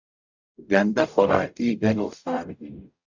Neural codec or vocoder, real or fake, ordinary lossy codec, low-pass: codec, 44.1 kHz, 0.9 kbps, DAC; fake; Opus, 64 kbps; 7.2 kHz